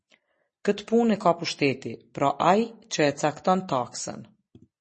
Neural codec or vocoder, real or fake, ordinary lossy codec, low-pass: none; real; MP3, 32 kbps; 10.8 kHz